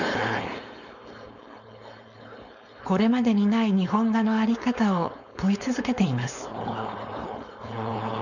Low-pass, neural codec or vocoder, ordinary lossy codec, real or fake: 7.2 kHz; codec, 16 kHz, 4.8 kbps, FACodec; none; fake